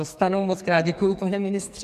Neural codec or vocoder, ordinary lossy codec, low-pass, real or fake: codec, 44.1 kHz, 2.6 kbps, SNAC; MP3, 96 kbps; 14.4 kHz; fake